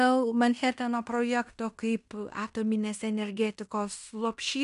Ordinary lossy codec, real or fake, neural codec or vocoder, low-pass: MP3, 96 kbps; fake; codec, 16 kHz in and 24 kHz out, 0.9 kbps, LongCat-Audio-Codec, fine tuned four codebook decoder; 10.8 kHz